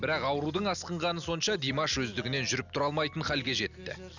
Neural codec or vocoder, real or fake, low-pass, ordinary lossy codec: none; real; 7.2 kHz; none